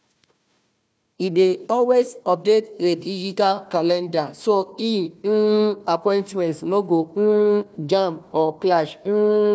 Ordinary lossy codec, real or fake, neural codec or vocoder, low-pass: none; fake; codec, 16 kHz, 1 kbps, FunCodec, trained on Chinese and English, 50 frames a second; none